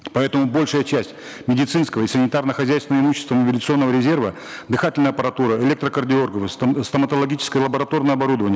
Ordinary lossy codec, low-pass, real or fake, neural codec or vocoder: none; none; real; none